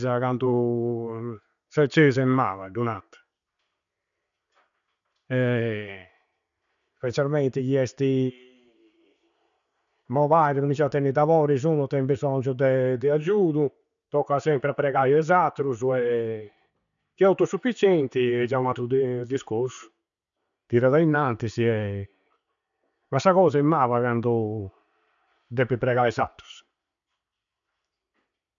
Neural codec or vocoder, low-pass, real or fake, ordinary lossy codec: none; 7.2 kHz; real; none